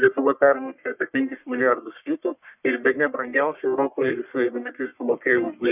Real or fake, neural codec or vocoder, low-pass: fake; codec, 44.1 kHz, 1.7 kbps, Pupu-Codec; 3.6 kHz